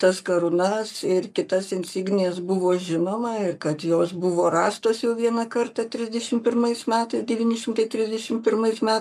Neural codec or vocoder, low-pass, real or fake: codec, 44.1 kHz, 7.8 kbps, Pupu-Codec; 14.4 kHz; fake